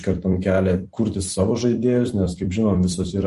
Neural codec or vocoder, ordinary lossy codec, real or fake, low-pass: vocoder, 44.1 kHz, 128 mel bands every 256 samples, BigVGAN v2; MP3, 48 kbps; fake; 14.4 kHz